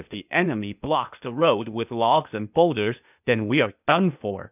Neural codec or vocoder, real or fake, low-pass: codec, 16 kHz, 0.8 kbps, ZipCodec; fake; 3.6 kHz